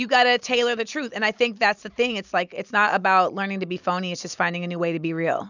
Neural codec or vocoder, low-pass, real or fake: none; 7.2 kHz; real